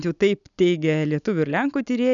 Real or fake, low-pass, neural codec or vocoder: real; 7.2 kHz; none